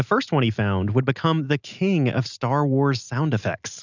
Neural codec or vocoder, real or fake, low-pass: none; real; 7.2 kHz